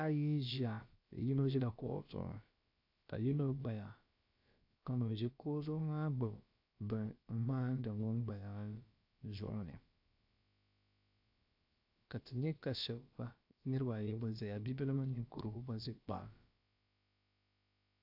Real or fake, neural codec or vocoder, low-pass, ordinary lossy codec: fake; codec, 16 kHz, about 1 kbps, DyCAST, with the encoder's durations; 5.4 kHz; AAC, 48 kbps